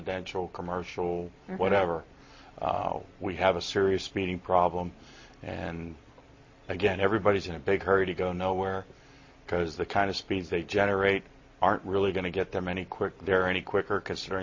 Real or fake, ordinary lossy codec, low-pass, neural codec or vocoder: real; MP3, 32 kbps; 7.2 kHz; none